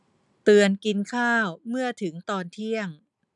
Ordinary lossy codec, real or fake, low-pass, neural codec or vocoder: none; real; 10.8 kHz; none